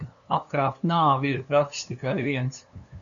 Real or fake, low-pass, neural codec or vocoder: fake; 7.2 kHz; codec, 16 kHz, 2 kbps, FunCodec, trained on LibriTTS, 25 frames a second